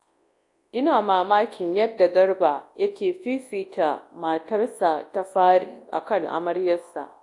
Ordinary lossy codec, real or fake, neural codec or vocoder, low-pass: AAC, 48 kbps; fake; codec, 24 kHz, 0.9 kbps, WavTokenizer, large speech release; 10.8 kHz